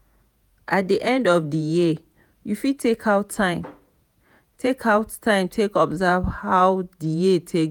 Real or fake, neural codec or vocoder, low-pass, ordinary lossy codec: fake; vocoder, 48 kHz, 128 mel bands, Vocos; none; none